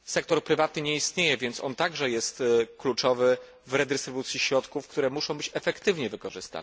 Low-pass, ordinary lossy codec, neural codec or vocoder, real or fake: none; none; none; real